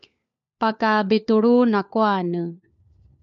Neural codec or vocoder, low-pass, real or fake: codec, 16 kHz, 4 kbps, FunCodec, trained on LibriTTS, 50 frames a second; 7.2 kHz; fake